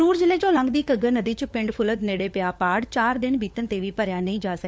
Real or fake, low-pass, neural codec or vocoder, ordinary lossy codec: fake; none; codec, 16 kHz, 4 kbps, FunCodec, trained on LibriTTS, 50 frames a second; none